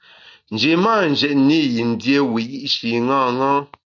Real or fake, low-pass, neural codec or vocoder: real; 7.2 kHz; none